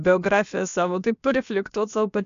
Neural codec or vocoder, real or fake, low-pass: codec, 16 kHz, about 1 kbps, DyCAST, with the encoder's durations; fake; 7.2 kHz